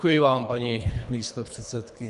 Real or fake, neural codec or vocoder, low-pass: fake; codec, 24 kHz, 3 kbps, HILCodec; 10.8 kHz